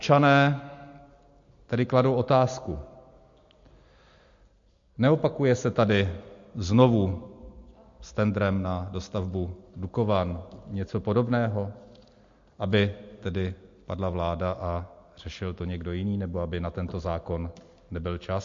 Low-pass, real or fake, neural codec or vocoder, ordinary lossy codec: 7.2 kHz; real; none; MP3, 48 kbps